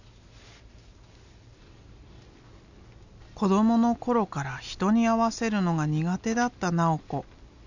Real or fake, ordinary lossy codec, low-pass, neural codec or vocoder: real; none; 7.2 kHz; none